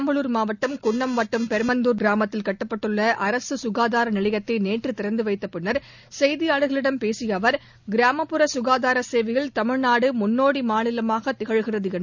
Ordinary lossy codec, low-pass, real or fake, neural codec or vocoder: none; 7.2 kHz; real; none